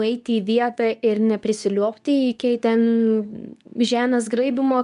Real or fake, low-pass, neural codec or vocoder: fake; 10.8 kHz; codec, 24 kHz, 0.9 kbps, WavTokenizer, medium speech release version 2